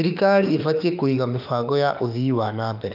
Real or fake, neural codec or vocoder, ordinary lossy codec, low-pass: fake; autoencoder, 48 kHz, 32 numbers a frame, DAC-VAE, trained on Japanese speech; none; 5.4 kHz